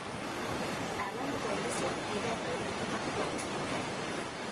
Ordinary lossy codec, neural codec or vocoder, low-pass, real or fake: Opus, 24 kbps; codec, 44.1 kHz, 7.8 kbps, Pupu-Codec; 10.8 kHz; fake